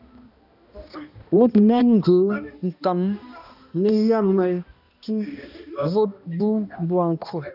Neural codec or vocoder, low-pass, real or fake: codec, 16 kHz, 1 kbps, X-Codec, HuBERT features, trained on balanced general audio; 5.4 kHz; fake